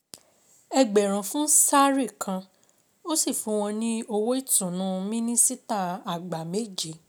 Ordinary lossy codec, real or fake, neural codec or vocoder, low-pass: none; real; none; none